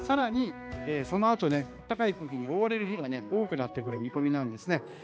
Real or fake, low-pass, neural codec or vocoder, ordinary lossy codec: fake; none; codec, 16 kHz, 2 kbps, X-Codec, HuBERT features, trained on balanced general audio; none